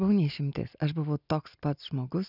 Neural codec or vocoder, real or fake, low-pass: none; real; 5.4 kHz